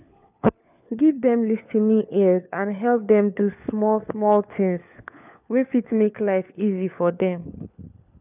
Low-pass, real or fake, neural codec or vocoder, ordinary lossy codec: 3.6 kHz; fake; codec, 16 kHz, 4 kbps, FunCodec, trained on LibriTTS, 50 frames a second; none